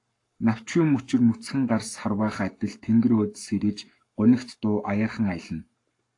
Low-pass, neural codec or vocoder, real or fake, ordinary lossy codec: 10.8 kHz; codec, 44.1 kHz, 7.8 kbps, Pupu-Codec; fake; AAC, 48 kbps